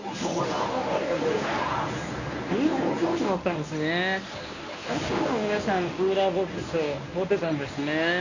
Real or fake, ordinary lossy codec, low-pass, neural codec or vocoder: fake; none; 7.2 kHz; codec, 24 kHz, 0.9 kbps, WavTokenizer, medium speech release version 1